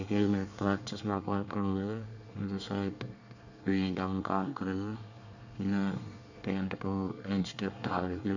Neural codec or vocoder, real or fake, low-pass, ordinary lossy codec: codec, 24 kHz, 1 kbps, SNAC; fake; 7.2 kHz; none